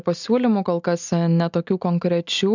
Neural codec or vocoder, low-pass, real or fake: none; 7.2 kHz; real